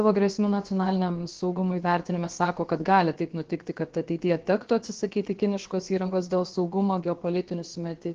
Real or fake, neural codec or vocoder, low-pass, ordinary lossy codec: fake; codec, 16 kHz, about 1 kbps, DyCAST, with the encoder's durations; 7.2 kHz; Opus, 16 kbps